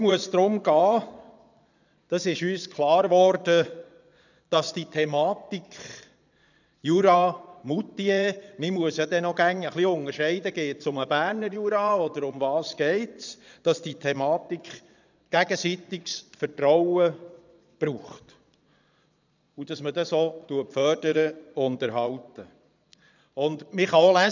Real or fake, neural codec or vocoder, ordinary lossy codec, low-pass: fake; vocoder, 22.05 kHz, 80 mel bands, Vocos; none; 7.2 kHz